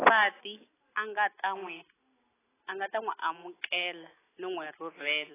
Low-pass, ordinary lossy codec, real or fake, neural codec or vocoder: 3.6 kHz; AAC, 16 kbps; real; none